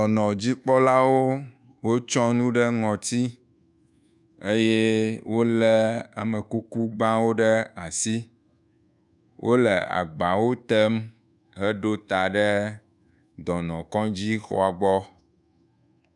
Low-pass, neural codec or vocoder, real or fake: 10.8 kHz; codec, 24 kHz, 1.2 kbps, DualCodec; fake